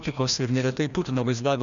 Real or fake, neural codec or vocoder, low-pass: fake; codec, 16 kHz, 1 kbps, FreqCodec, larger model; 7.2 kHz